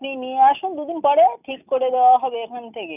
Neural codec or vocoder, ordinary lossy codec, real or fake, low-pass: none; none; real; 3.6 kHz